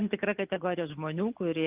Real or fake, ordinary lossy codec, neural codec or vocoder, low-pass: real; Opus, 32 kbps; none; 3.6 kHz